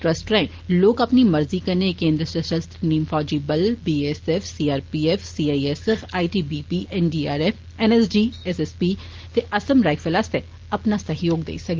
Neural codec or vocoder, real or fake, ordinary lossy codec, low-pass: none; real; Opus, 16 kbps; 7.2 kHz